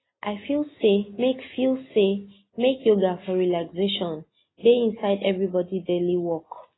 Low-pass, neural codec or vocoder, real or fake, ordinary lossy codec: 7.2 kHz; none; real; AAC, 16 kbps